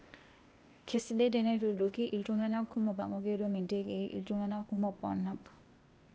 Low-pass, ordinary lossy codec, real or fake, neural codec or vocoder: none; none; fake; codec, 16 kHz, 0.8 kbps, ZipCodec